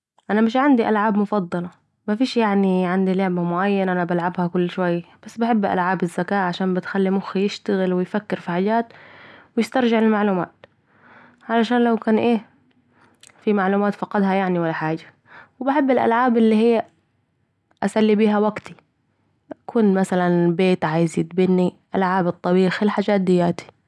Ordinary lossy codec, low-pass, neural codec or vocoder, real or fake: none; none; none; real